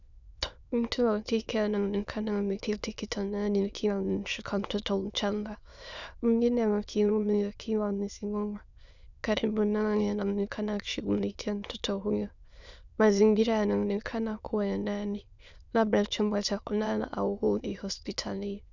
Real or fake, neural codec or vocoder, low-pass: fake; autoencoder, 22.05 kHz, a latent of 192 numbers a frame, VITS, trained on many speakers; 7.2 kHz